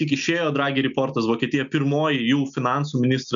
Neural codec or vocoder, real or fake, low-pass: none; real; 7.2 kHz